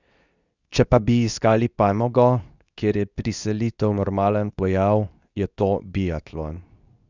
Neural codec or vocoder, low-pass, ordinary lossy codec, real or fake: codec, 24 kHz, 0.9 kbps, WavTokenizer, medium speech release version 2; 7.2 kHz; none; fake